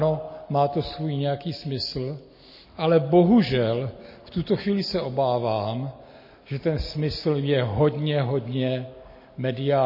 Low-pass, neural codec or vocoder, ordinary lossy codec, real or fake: 5.4 kHz; none; MP3, 24 kbps; real